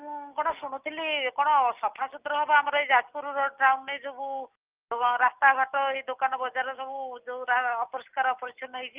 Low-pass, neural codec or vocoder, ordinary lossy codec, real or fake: 3.6 kHz; none; Opus, 24 kbps; real